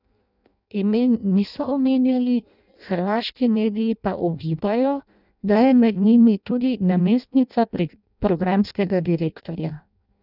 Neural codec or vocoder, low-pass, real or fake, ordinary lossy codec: codec, 16 kHz in and 24 kHz out, 0.6 kbps, FireRedTTS-2 codec; 5.4 kHz; fake; none